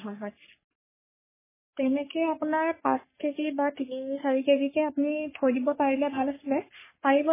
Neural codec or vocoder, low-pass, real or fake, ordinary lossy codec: codec, 44.1 kHz, 3.4 kbps, Pupu-Codec; 3.6 kHz; fake; MP3, 16 kbps